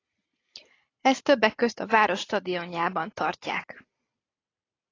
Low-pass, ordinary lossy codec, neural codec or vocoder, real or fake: 7.2 kHz; AAC, 32 kbps; vocoder, 44.1 kHz, 128 mel bands every 256 samples, BigVGAN v2; fake